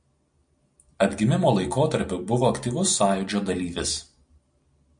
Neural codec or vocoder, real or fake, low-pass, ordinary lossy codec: none; real; 9.9 kHz; MP3, 64 kbps